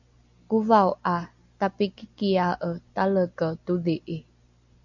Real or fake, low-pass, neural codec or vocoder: real; 7.2 kHz; none